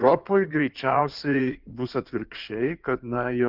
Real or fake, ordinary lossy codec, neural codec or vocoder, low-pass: fake; Opus, 32 kbps; codec, 16 kHz in and 24 kHz out, 2.2 kbps, FireRedTTS-2 codec; 5.4 kHz